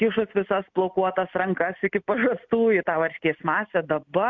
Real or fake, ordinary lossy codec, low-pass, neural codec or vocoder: real; MP3, 64 kbps; 7.2 kHz; none